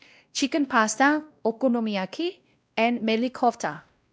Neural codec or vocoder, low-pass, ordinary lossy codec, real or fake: codec, 16 kHz, 1 kbps, X-Codec, WavLM features, trained on Multilingual LibriSpeech; none; none; fake